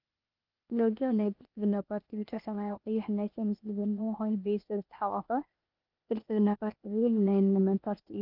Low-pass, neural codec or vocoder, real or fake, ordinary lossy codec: 5.4 kHz; codec, 16 kHz, 0.8 kbps, ZipCodec; fake; Opus, 24 kbps